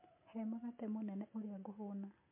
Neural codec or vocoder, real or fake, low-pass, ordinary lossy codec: none; real; 3.6 kHz; MP3, 24 kbps